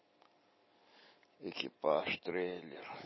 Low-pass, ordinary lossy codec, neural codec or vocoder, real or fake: 7.2 kHz; MP3, 24 kbps; none; real